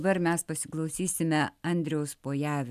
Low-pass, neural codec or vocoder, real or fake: 14.4 kHz; none; real